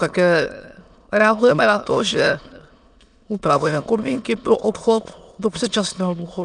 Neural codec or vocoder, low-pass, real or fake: autoencoder, 22.05 kHz, a latent of 192 numbers a frame, VITS, trained on many speakers; 9.9 kHz; fake